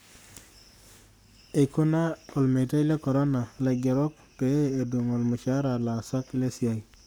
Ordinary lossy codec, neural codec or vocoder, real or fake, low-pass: none; codec, 44.1 kHz, 7.8 kbps, Pupu-Codec; fake; none